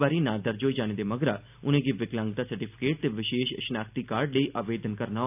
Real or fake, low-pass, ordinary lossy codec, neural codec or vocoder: real; 3.6 kHz; AAC, 32 kbps; none